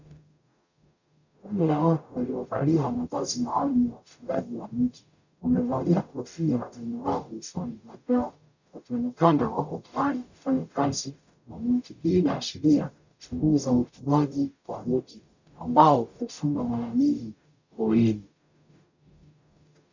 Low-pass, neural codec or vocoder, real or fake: 7.2 kHz; codec, 44.1 kHz, 0.9 kbps, DAC; fake